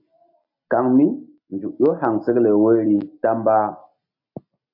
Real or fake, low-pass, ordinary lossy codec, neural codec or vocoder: real; 5.4 kHz; MP3, 48 kbps; none